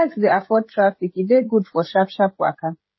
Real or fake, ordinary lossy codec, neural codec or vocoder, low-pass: fake; MP3, 24 kbps; codec, 16 kHz, 16 kbps, FreqCodec, smaller model; 7.2 kHz